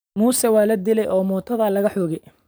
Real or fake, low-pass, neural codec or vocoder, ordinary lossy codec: fake; none; vocoder, 44.1 kHz, 128 mel bands every 512 samples, BigVGAN v2; none